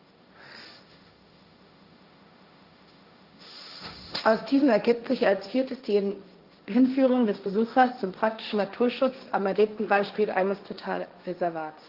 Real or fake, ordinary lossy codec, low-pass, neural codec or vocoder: fake; Opus, 32 kbps; 5.4 kHz; codec, 16 kHz, 1.1 kbps, Voila-Tokenizer